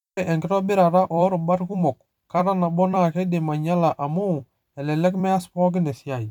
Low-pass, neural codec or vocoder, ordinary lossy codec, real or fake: 19.8 kHz; vocoder, 44.1 kHz, 128 mel bands every 256 samples, BigVGAN v2; none; fake